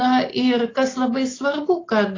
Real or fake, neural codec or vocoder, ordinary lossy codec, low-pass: real; none; AAC, 32 kbps; 7.2 kHz